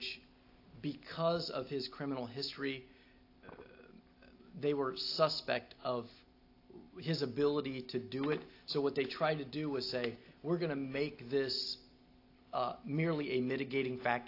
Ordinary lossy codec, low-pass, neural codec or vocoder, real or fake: AAC, 32 kbps; 5.4 kHz; none; real